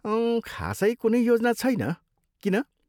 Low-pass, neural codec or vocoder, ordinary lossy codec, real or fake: 19.8 kHz; none; none; real